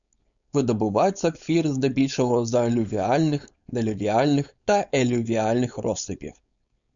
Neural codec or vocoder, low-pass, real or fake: codec, 16 kHz, 4.8 kbps, FACodec; 7.2 kHz; fake